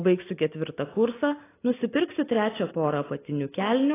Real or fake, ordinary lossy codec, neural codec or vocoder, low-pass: real; AAC, 16 kbps; none; 3.6 kHz